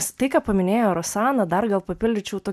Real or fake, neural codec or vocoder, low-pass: real; none; 14.4 kHz